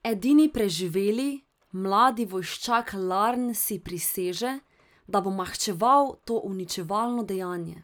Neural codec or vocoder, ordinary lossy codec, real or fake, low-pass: none; none; real; none